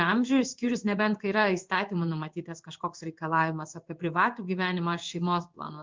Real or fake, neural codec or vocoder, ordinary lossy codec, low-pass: fake; codec, 16 kHz in and 24 kHz out, 1 kbps, XY-Tokenizer; Opus, 24 kbps; 7.2 kHz